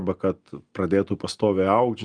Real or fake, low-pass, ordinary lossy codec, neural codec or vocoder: real; 9.9 kHz; Opus, 32 kbps; none